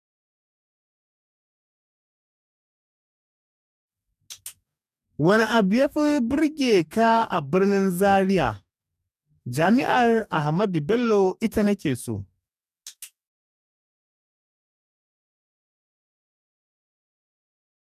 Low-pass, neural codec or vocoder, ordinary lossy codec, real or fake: 14.4 kHz; codec, 44.1 kHz, 2.6 kbps, DAC; none; fake